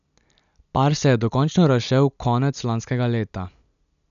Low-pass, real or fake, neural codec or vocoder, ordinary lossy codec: 7.2 kHz; real; none; none